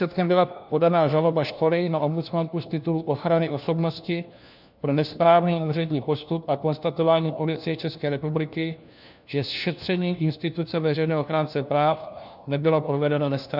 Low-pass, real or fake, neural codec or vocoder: 5.4 kHz; fake; codec, 16 kHz, 1 kbps, FunCodec, trained on LibriTTS, 50 frames a second